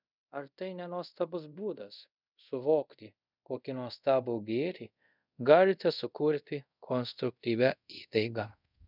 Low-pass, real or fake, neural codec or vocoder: 5.4 kHz; fake; codec, 24 kHz, 0.5 kbps, DualCodec